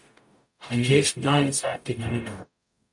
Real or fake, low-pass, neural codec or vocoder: fake; 10.8 kHz; codec, 44.1 kHz, 0.9 kbps, DAC